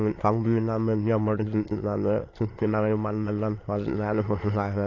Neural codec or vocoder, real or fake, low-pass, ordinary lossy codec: autoencoder, 22.05 kHz, a latent of 192 numbers a frame, VITS, trained on many speakers; fake; 7.2 kHz; AAC, 32 kbps